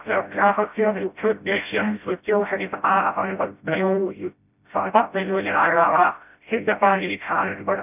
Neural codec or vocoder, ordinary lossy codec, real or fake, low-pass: codec, 16 kHz, 0.5 kbps, FreqCodec, smaller model; none; fake; 3.6 kHz